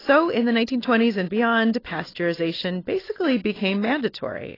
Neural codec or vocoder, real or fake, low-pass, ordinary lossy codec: none; real; 5.4 kHz; AAC, 24 kbps